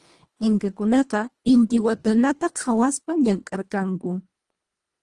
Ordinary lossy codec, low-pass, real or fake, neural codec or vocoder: Opus, 24 kbps; 10.8 kHz; fake; codec, 24 kHz, 1.5 kbps, HILCodec